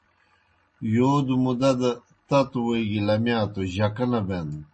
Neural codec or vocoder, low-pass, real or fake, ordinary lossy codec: none; 9.9 kHz; real; MP3, 32 kbps